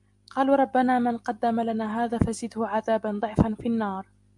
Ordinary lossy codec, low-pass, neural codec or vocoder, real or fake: MP3, 96 kbps; 10.8 kHz; none; real